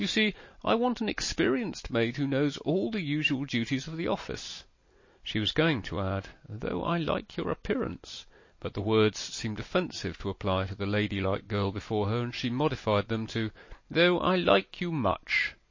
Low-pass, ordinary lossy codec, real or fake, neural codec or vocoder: 7.2 kHz; MP3, 32 kbps; real; none